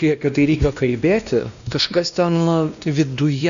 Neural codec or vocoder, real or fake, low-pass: codec, 16 kHz, 1 kbps, X-Codec, WavLM features, trained on Multilingual LibriSpeech; fake; 7.2 kHz